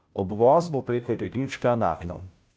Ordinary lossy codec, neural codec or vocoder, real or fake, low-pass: none; codec, 16 kHz, 0.5 kbps, FunCodec, trained on Chinese and English, 25 frames a second; fake; none